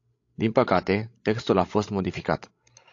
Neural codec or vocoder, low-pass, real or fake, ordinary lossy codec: codec, 16 kHz, 16 kbps, FreqCodec, larger model; 7.2 kHz; fake; AAC, 48 kbps